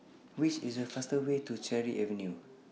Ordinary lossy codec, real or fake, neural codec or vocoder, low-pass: none; real; none; none